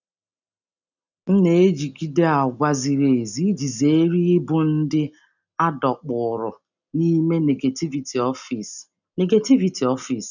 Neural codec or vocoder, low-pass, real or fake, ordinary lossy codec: none; 7.2 kHz; real; none